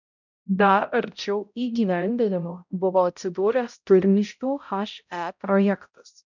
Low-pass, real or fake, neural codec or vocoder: 7.2 kHz; fake; codec, 16 kHz, 0.5 kbps, X-Codec, HuBERT features, trained on balanced general audio